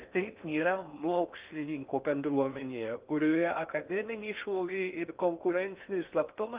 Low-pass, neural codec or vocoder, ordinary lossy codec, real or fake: 3.6 kHz; codec, 16 kHz in and 24 kHz out, 0.6 kbps, FocalCodec, streaming, 4096 codes; Opus, 24 kbps; fake